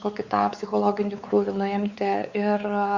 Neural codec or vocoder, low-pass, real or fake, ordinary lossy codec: codec, 16 kHz, 4 kbps, X-Codec, WavLM features, trained on Multilingual LibriSpeech; 7.2 kHz; fake; Opus, 64 kbps